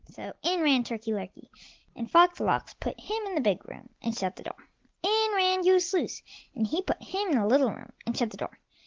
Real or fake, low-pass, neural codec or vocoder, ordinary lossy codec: real; 7.2 kHz; none; Opus, 16 kbps